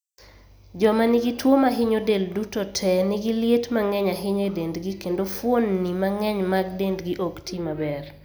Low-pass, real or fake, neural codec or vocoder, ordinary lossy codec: none; real; none; none